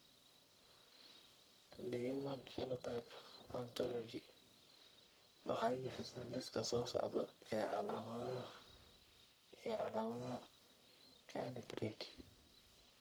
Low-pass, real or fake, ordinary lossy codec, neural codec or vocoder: none; fake; none; codec, 44.1 kHz, 1.7 kbps, Pupu-Codec